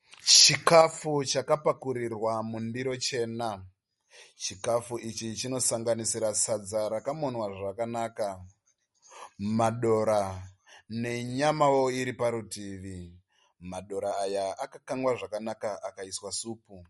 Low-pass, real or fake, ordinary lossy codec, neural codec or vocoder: 19.8 kHz; real; MP3, 48 kbps; none